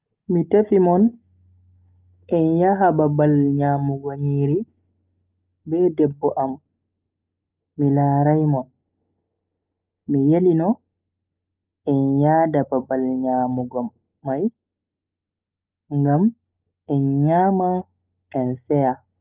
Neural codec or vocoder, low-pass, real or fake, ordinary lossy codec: none; 3.6 kHz; real; Opus, 24 kbps